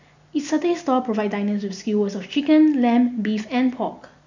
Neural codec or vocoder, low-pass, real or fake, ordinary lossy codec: none; 7.2 kHz; real; none